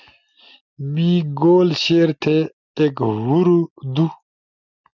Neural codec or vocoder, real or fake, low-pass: none; real; 7.2 kHz